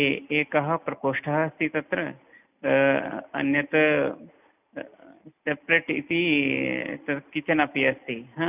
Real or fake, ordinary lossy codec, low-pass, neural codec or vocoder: real; none; 3.6 kHz; none